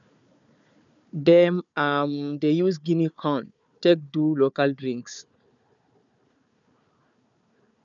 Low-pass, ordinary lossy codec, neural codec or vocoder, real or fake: 7.2 kHz; none; codec, 16 kHz, 4 kbps, FunCodec, trained on Chinese and English, 50 frames a second; fake